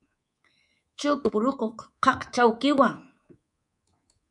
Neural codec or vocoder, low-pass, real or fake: codec, 24 kHz, 3.1 kbps, DualCodec; 10.8 kHz; fake